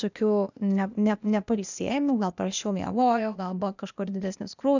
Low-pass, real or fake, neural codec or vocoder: 7.2 kHz; fake; codec, 16 kHz, 0.8 kbps, ZipCodec